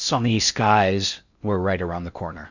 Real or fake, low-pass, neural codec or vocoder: fake; 7.2 kHz; codec, 16 kHz in and 24 kHz out, 0.6 kbps, FocalCodec, streaming, 4096 codes